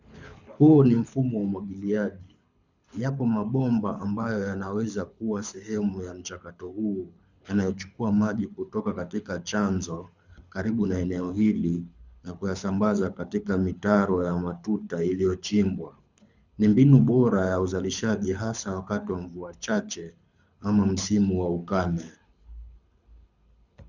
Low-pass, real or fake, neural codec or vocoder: 7.2 kHz; fake; codec, 24 kHz, 6 kbps, HILCodec